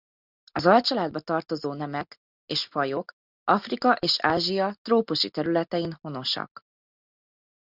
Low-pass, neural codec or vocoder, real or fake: 5.4 kHz; none; real